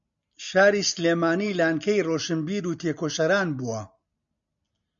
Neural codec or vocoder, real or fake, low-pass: none; real; 7.2 kHz